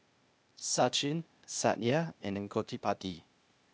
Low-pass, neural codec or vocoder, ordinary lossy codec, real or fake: none; codec, 16 kHz, 0.8 kbps, ZipCodec; none; fake